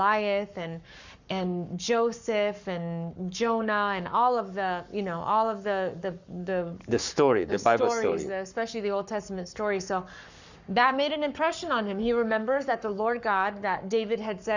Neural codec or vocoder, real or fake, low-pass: codec, 44.1 kHz, 7.8 kbps, Pupu-Codec; fake; 7.2 kHz